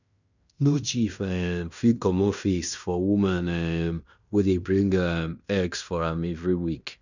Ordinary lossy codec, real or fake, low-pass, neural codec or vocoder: none; fake; 7.2 kHz; codec, 16 kHz in and 24 kHz out, 0.9 kbps, LongCat-Audio-Codec, fine tuned four codebook decoder